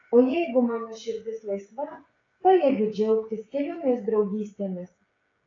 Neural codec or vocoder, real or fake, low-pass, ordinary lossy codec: codec, 16 kHz, 8 kbps, FreqCodec, smaller model; fake; 7.2 kHz; AAC, 32 kbps